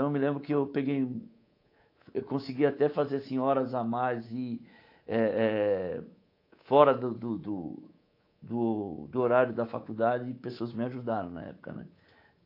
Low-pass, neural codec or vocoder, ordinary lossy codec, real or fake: 5.4 kHz; codec, 24 kHz, 3.1 kbps, DualCodec; AAC, 32 kbps; fake